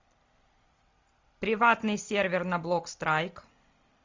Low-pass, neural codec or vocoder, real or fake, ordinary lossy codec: 7.2 kHz; none; real; MP3, 64 kbps